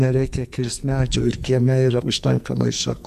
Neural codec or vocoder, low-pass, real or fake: codec, 44.1 kHz, 2.6 kbps, SNAC; 14.4 kHz; fake